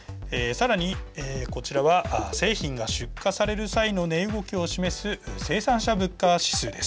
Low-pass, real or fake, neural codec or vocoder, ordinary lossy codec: none; real; none; none